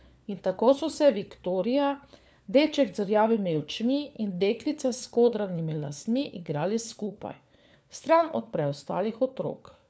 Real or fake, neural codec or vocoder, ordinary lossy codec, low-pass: fake; codec, 16 kHz, 4 kbps, FunCodec, trained on LibriTTS, 50 frames a second; none; none